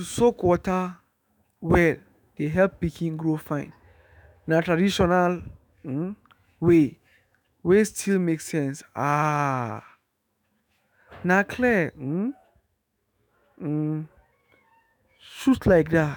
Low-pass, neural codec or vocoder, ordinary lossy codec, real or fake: none; autoencoder, 48 kHz, 128 numbers a frame, DAC-VAE, trained on Japanese speech; none; fake